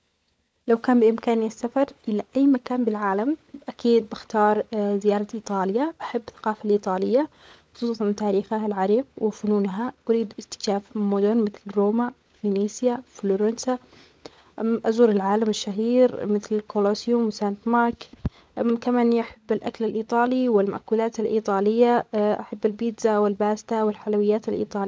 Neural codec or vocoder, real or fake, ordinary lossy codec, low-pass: codec, 16 kHz, 16 kbps, FunCodec, trained on LibriTTS, 50 frames a second; fake; none; none